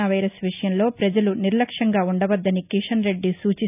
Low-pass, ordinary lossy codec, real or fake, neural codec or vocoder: 3.6 kHz; none; real; none